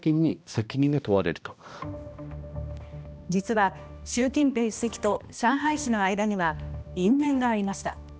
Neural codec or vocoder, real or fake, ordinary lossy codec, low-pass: codec, 16 kHz, 1 kbps, X-Codec, HuBERT features, trained on balanced general audio; fake; none; none